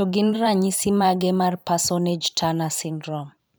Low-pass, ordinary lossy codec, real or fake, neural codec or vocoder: none; none; fake; vocoder, 44.1 kHz, 128 mel bands every 512 samples, BigVGAN v2